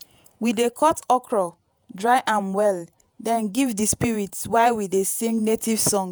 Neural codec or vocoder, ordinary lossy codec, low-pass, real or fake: vocoder, 48 kHz, 128 mel bands, Vocos; none; none; fake